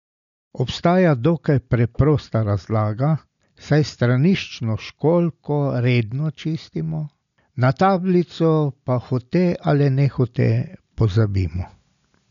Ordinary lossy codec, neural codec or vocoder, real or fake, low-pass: none; none; real; 7.2 kHz